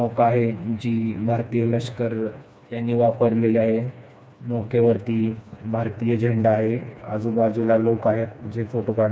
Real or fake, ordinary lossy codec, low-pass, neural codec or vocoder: fake; none; none; codec, 16 kHz, 2 kbps, FreqCodec, smaller model